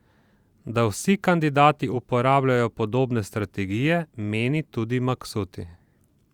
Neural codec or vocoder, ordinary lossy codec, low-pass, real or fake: vocoder, 44.1 kHz, 128 mel bands every 512 samples, BigVGAN v2; Opus, 64 kbps; 19.8 kHz; fake